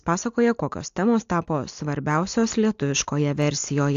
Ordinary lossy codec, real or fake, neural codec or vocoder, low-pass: AAC, 64 kbps; real; none; 7.2 kHz